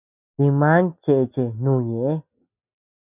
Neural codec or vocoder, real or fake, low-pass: none; real; 3.6 kHz